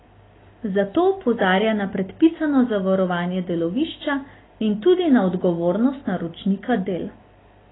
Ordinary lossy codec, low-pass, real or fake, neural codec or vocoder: AAC, 16 kbps; 7.2 kHz; real; none